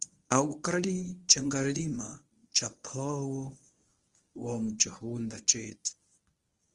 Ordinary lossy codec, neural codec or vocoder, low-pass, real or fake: Opus, 24 kbps; codec, 24 kHz, 0.9 kbps, WavTokenizer, medium speech release version 1; 10.8 kHz; fake